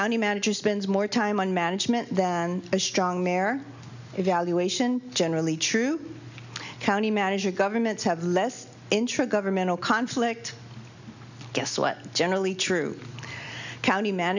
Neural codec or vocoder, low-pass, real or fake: none; 7.2 kHz; real